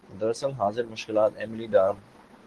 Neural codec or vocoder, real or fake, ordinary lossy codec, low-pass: none; real; Opus, 16 kbps; 10.8 kHz